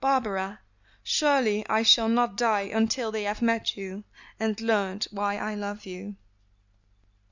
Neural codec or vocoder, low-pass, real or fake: none; 7.2 kHz; real